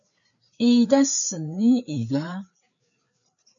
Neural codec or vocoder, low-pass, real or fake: codec, 16 kHz, 4 kbps, FreqCodec, larger model; 7.2 kHz; fake